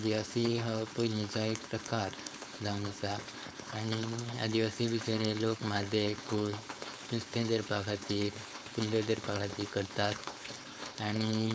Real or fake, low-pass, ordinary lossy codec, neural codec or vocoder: fake; none; none; codec, 16 kHz, 4.8 kbps, FACodec